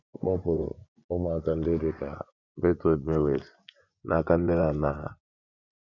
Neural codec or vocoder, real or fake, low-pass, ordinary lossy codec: vocoder, 24 kHz, 100 mel bands, Vocos; fake; 7.2 kHz; none